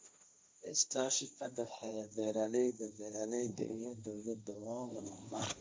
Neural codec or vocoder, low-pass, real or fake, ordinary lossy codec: codec, 16 kHz, 1.1 kbps, Voila-Tokenizer; none; fake; none